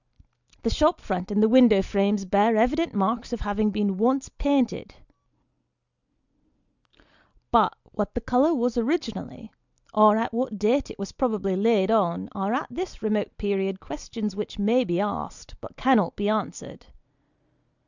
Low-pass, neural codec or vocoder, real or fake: 7.2 kHz; none; real